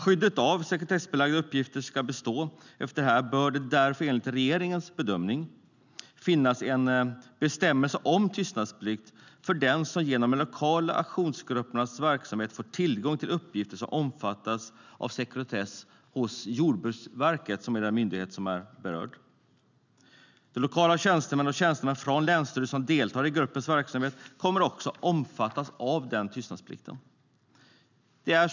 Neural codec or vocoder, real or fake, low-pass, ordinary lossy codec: none; real; 7.2 kHz; none